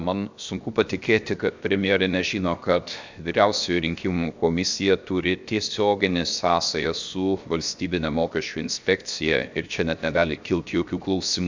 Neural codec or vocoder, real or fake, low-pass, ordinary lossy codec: codec, 16 kHz, 0.7 kbps, FocalCodec; fake; 7.2 kHz; MP3, 64 kbps